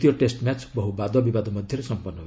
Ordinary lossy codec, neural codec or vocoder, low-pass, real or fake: none; none; none; real